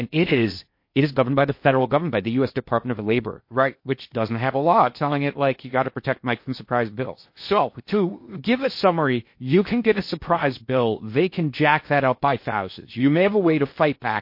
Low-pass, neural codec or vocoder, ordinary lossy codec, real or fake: 5.4 kHz; codec, 16 kHz in and 24 kHz out, 0.6 kbps, FocalCodec, streaming, 2048 codes; MP3, 32 kbps; fake